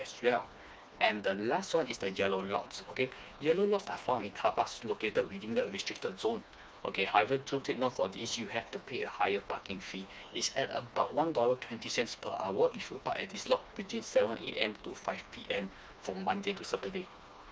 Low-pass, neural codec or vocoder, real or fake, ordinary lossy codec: none; codec, 16 kHz, 2 kbps, FreqCodec, smaller model; fake; none